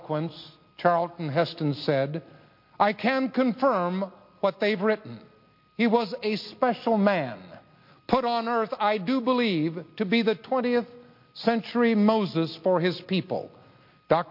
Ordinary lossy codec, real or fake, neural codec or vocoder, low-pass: MP3, 32 kbps; real; none; 5.4 kHz